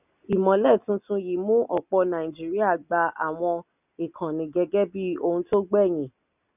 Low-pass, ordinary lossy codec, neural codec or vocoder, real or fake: 3.6 kHz; none; none; real